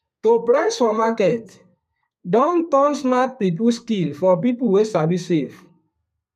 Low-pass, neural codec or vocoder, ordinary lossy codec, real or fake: 14.4 kHz; codec, 32 kHz, 1.9 kbps, SNAC; none; fake